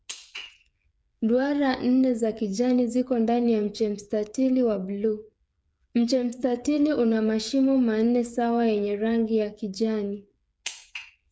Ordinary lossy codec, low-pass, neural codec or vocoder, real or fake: none; none; codec, 16 kHz, 8 kbps, FreqCodec, smaller model; fake